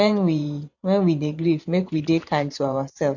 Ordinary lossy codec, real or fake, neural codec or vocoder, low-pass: none; fake; vocoder, 24 kHz, 100 mel bands, Vocos; 7.2 kHz